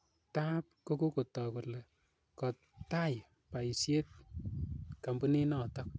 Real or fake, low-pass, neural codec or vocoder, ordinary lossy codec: real; none; none; none